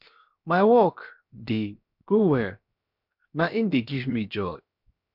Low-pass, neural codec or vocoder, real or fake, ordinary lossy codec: 5.4 kHz; codec, 16 kHz, 0.7 kbps, FocalCodec; fake; none